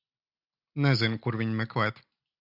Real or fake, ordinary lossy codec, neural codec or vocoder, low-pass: real; AAC, 48 kbps; none; 5.4 kHz